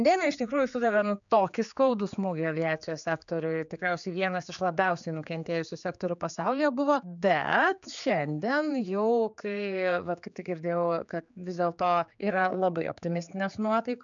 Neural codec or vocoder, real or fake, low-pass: codec, 16 kHz, 4 kbps, X-Codec, HuBERT features, trained on general audio; fake; 7.2 kHz